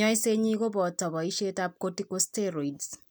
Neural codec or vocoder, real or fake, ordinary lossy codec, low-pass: none; real; none; none